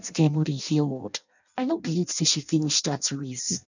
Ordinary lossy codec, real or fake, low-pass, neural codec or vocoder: none; fake; 7.2 kHz; codec, 16 kHz in and 24 kHz out, 0.6 kbps, FireRedTTS-2 codec